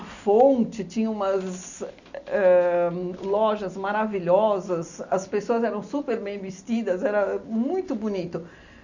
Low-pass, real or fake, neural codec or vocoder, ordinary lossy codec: 7.2 kHz; real; none; none